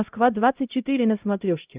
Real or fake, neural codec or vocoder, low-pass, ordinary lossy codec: fake; codec, 16 kHz, 0.5 kbps, X-Codec, HuBERT features, trained on LibriSpeech; 3.6 kHz; Opus, 32 kbps